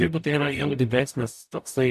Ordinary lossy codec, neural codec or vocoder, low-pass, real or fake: MP3, 96 kbps; codec, 44.1 kHz, 0.9 kbps, DAC; 14.4 kHz; fake